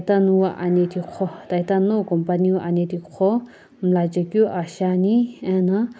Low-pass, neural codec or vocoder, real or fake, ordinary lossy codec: none; none; real; none